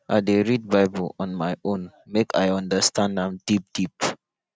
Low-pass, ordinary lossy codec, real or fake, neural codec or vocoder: none; none; real; none